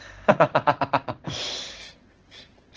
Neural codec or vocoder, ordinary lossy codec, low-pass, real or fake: codec, 16 kHz in and 24 kHz out, 1 kbps, XY-Tokenizer; Opus, 16 kbps; 7.2 kHz; fake